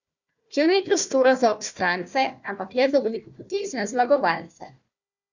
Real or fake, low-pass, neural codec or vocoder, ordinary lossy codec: fake; 7.2 kHz; codec, 16 kHz, 1 kbps, FunCodec, trained on Chinese and English, 50 frames a second; none